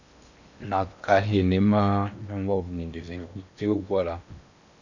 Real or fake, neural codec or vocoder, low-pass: fake; codec, 16 kHz in and 24 kHz out, 0.8 kbps, FocalCodec, streaming, 65536 codes; 7.2 kHz